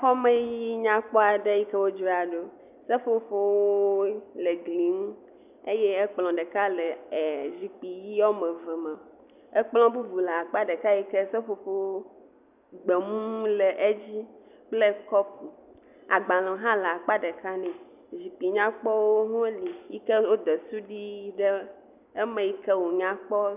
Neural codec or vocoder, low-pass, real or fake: none; 3.6 kHz; real